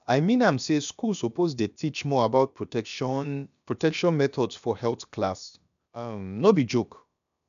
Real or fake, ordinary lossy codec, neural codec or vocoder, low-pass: fake; none; codec, 16 kHz, about 1 kbps, DyCAST, with the encoder's durations; 7.2 kHz